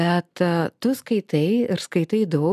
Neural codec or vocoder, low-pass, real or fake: none; 14.4 kHz; real